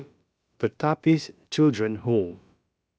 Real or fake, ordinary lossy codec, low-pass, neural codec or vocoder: fake; none; none; codec, 16 kHz, about 1 kbps, DyCAST, with the encoder's durations